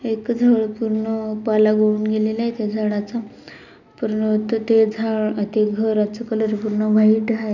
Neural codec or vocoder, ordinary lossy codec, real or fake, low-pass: none; none; real; 7.2 kHz